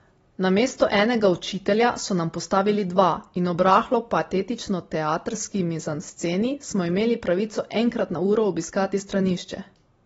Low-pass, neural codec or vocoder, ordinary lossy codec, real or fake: 19.8 kHz; none; AAC, 24 kbps; real